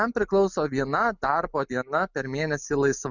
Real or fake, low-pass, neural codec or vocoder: real; 7.2 kHz; none